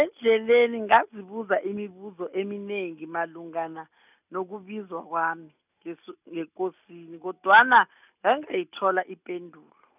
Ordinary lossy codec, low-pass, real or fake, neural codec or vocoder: none; 3.6 kHz; real; none